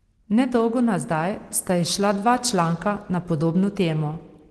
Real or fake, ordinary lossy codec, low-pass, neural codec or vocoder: real; Opus, 16 kbps; 10.8 kHz; none